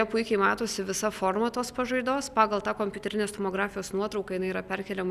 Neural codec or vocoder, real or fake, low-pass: none; real; 14.4 kHz